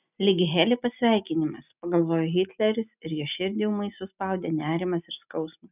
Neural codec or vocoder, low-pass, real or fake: none; 3.6 kHz; real